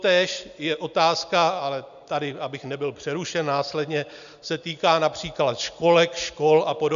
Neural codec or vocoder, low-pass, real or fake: none; 7.2 kHz; real